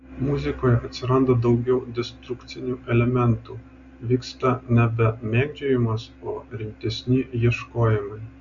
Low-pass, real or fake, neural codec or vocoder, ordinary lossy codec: 7.2 kHz; real; none; AAC, 64 kbps